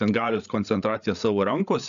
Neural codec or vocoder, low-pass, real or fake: codec, 16 kHz, 8 kbps, FreqCodec, larger model; 7.2 kHz; fake